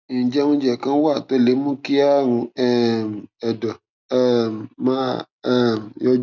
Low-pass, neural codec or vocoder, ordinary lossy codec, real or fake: 7.2 kHz; none; none; real